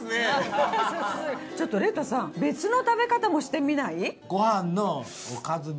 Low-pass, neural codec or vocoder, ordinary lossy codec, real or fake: none; none; none; real